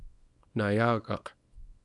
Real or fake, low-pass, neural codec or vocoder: fake; 10.8 kHz; codec, 24 kHz, 0.9 kbps, WavTokenizer, small release